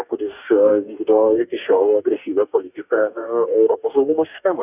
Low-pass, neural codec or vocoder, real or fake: 3.6 kHz; codec, 44.1 kHz, 2.6 kbps, DAC; fake